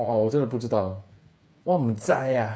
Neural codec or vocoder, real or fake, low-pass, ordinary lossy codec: codec, 16 kHz, 8 kbps, FreqCodec, smaller model; fake; none; none